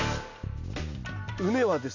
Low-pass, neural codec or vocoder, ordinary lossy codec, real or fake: 7.2 kHz; none; none; real